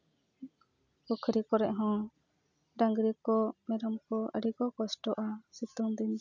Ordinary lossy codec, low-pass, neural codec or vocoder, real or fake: none; 7.2 kHz; none; real